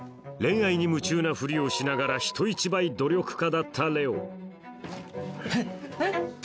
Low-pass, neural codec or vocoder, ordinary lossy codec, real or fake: none; none; none; real